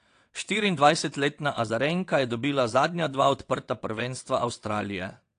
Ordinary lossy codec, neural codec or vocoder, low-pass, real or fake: AAC, 48 kbps; vocoder, 22.05 kHz, 80 mel bands, WaveNeXt; 9.9 kHz; fake